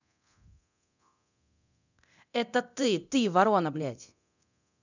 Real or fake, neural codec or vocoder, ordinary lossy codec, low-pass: fake; codec, 24 kHz, 0.9 kbps, DualCodec; none; 7.2 kHz